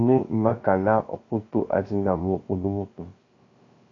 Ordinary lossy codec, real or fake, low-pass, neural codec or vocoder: AAC, 32 kbps; fake; 7.2 kHz; codec, 16 kHz, 0.3 kbps, FocalCodec